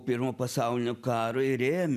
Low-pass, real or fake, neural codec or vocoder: 14.4 kHz; real; none